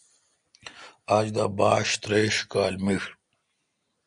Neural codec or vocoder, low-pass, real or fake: none; 9.9 kHz; real